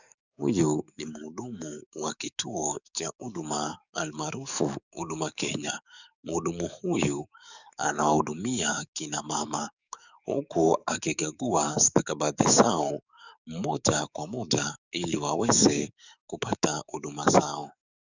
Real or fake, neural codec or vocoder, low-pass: fake; codec, 44.1 kHz, 7.8 kbps, DAC; 7.2 kHz